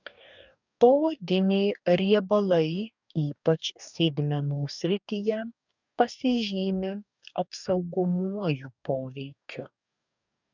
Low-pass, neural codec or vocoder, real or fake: 7.2 kHz; codec, 44.1 kHz, 2.6 kbps, DAC; fake